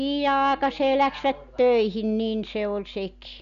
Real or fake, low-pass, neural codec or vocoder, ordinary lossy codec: real; 7.2 kHz; none; none